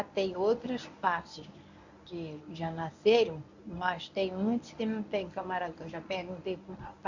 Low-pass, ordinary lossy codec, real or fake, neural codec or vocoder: 7.2 kHz; none; fake; codec, 24 kHz, 0.9 kbps, WavTokenizer, medium speech release version 1